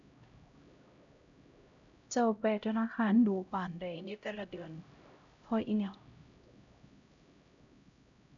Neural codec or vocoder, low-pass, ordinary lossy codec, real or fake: codec, 16 kHz, 0.5 kbps, X-Codec, HuBERT features, trained on LibriSpeech; 7.2 kHz; none; fake